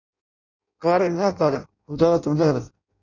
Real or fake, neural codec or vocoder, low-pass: fake; codec, 16 kHz in and 24 kHz out, 0.6 kbps, FireRedTTS-2 codec; 7.2 kHz